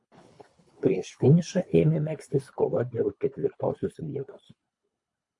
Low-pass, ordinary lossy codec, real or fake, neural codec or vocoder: 10.8 kHz; MP3, 48 kbps; fake; codec, 24 kHz, 3 kbps, HILCodec